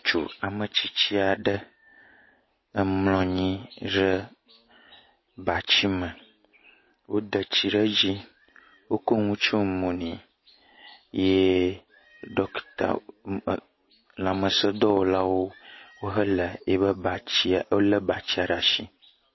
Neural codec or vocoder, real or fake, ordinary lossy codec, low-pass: none; real; MP3, 24 kbps; 7.2 kHz